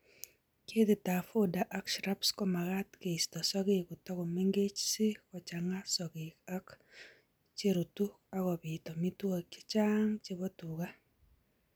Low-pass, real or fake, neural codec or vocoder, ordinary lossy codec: none; real; none; none